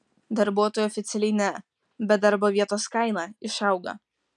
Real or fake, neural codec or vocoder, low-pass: real; none; 10.8 kHz